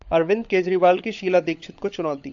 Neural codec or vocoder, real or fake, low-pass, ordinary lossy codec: codec, 16 kHz, 16 kbps, FunCodec, trained on LibriTTS, 50 frames a second; fake; 7.2 kHz; AAC, 64 kbps